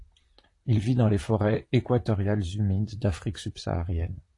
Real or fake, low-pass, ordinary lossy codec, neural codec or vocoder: fake; 10.8 kHz; AAC, 48 kbps; vocoder, 44.1 kHz, 128 mel bands, Pupu-Vocoder